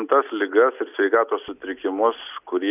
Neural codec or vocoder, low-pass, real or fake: none; 3.6 kHz; real